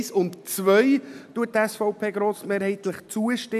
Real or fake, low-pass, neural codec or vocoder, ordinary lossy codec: real; 14.4 kHz; none; AAC, 96 kbps